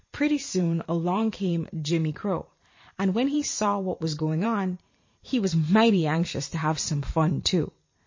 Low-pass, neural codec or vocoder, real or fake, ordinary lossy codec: 7.2 kHz; vocoder, 44.1 kHz, 128 mel bands every 512 samples, BigVGAN v2; fake; MP3, 32 kbps